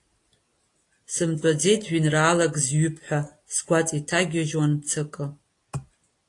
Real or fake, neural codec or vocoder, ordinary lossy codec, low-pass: real; none; AAC, 48 kbps; 10.8 kHz